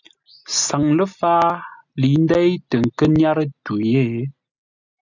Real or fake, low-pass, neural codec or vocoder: real; 7.2 kHz; none